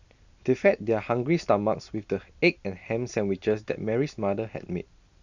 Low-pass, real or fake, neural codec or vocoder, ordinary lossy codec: 7.2 kHz; real; none; none